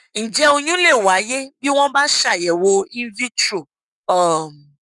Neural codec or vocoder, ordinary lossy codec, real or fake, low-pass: codec, 44.1 kHz, 7.8 kbps, Pupu-Codec; none; fake; 10.8 kHz